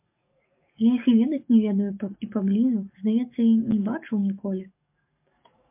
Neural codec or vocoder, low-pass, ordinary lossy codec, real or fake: codec, 44.1 kHz, 7.8 kbps, DAC; 3.6 kHz; MP3, 32 kbps; fake